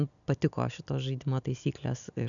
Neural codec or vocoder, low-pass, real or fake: none; 7.2 kHz; real